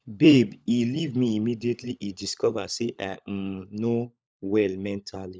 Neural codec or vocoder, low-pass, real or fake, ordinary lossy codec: codec, 16 kHz, 16 kbps, FunCodec, trained on LibriTTS, 50 frames a second; none; fake; none